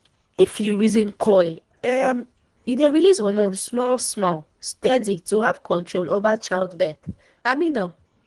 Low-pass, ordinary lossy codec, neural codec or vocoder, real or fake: 10.8 kHz; Opus, 24 kbps; codec, 24 kHz, 1.5 kbps, HILCodec; fake